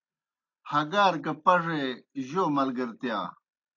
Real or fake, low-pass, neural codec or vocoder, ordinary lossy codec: real; 7.2 kHz; none; AAC, 48 kbps